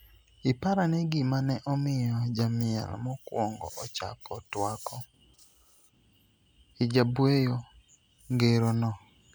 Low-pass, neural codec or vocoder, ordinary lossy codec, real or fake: none; none; none; real